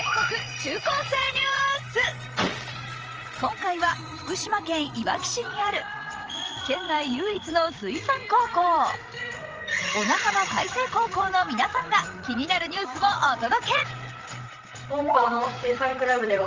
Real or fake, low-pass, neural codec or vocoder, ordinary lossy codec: fake; 7.2 kHz; codec, 16 kHz, 8 kbps, FreqCodec, larger model; Opus, 24 kbps